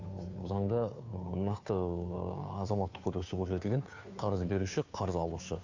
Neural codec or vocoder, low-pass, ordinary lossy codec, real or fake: codec, 16 kHz, 2 kbps, FunCodec, trained on Chinese and English, 25 frames a second; 7.2 kHz; AAC, 48 kbps; fake